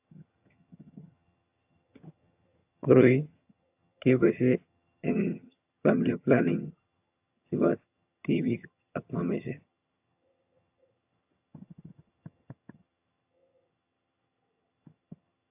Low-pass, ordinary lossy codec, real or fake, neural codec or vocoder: 3.6 kHz; AAC, 32 kbps; fake; vocoder, 22.05 kHz, 80 mel bands, HiFi-GAN